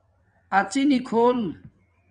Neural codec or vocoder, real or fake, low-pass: vocoder, 22.05 kHz, 80 mel bands, WaveNeXt; fake; 9.9 kHz